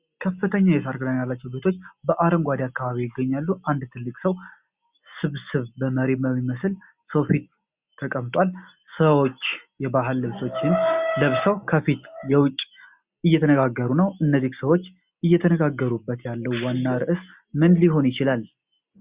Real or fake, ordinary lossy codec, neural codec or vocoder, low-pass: real; Opus, 64 kbps; none; 3.6 kHz